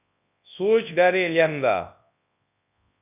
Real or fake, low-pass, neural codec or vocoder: fake; 3.6 kHz; codec, 24 kHz, 0.9 kbps, WavTokenizer, large speech release